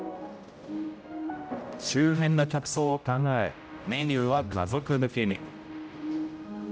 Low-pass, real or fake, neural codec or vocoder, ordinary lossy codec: none; fake; codec, 16 kHz, 0.5 kbps, X-Codec, HuBERT features, trained on general audio; none